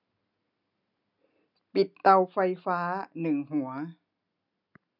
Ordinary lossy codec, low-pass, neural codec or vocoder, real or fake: none; 5.4 kHz; none; real